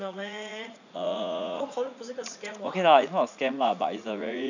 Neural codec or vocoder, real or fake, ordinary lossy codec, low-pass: vocoder, 44.1 kHz, 80 mel bands, Vocos; fake; none; 7.2 kHz